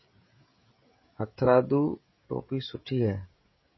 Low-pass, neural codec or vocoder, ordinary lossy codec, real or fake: 7.2 kHz; codec, 16 kHz, 16 kbps, FreqCodec, smaller model; MP3, 24 kbps; fake